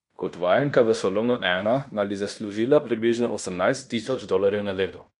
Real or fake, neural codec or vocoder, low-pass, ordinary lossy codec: fake; codec, 16 kHz in and 24 kHz out, 0.9 kbps, LongCat-Audio-Codec, fine tuned four codebook decoder; 10.8 kHz; none